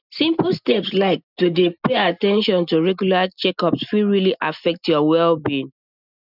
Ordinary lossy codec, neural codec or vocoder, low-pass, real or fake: none; none; 5.4 kHz; real